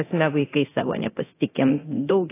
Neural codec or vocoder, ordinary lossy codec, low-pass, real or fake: codec, 24 kHz, 0.9 kbps, DualCodec; AAC, 16 kbps; 3.6 kHz; fake